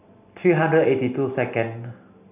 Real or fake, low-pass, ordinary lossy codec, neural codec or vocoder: real; 3.6 kHz; none; none